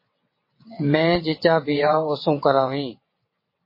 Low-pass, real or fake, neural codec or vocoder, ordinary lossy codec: 5.4 kHz; fake; vocoder, 22.05 kHz, 80 mel bands, WaveNeXt; MP3, 24 kbps